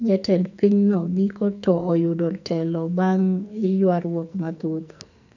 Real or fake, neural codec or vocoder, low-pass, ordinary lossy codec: fake; codec, 44.1 kHz, 2.6 kbps, SNAC; 7.2 kHz; none